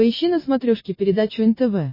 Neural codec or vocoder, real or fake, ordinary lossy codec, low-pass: none; real; MP3, 24 kbps; 5.4 kHz